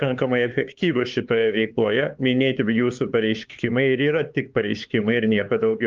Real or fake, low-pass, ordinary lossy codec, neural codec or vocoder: fake; 7.2 kHz; Opus, 24 kbps; codec, 16 kHz, 4 kbps, X-Codec, HuBERT features, trained on LibriSpeech